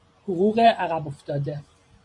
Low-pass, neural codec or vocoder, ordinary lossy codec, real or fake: 10.8 kHz; vocoder, 44.1 kHz, 128 mel bands every 512 samples, BigVGAN v2; MP3, 48 kbps; fake